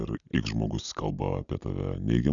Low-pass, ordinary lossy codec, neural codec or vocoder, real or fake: 7.2 kHz; MP3, 96 kbps; none; real